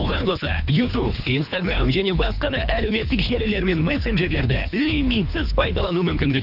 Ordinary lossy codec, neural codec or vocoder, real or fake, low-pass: none; codec, 24 kHz, 3 kbps, HILCodec; fake; 5.4 kHz